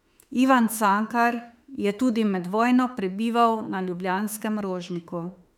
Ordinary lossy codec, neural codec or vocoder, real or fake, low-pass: none; autoencoder, 48 kHz, 32 numbers a frame, DAC-VAE, trained on Japanese speech; fake; 19.8 kHz